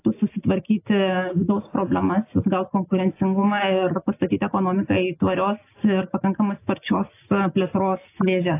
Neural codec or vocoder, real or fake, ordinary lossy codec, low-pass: none; real; AAC, 24 kbps; 3.6 kHz